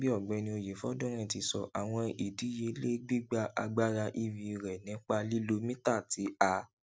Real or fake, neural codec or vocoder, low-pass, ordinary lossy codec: real; none; none; none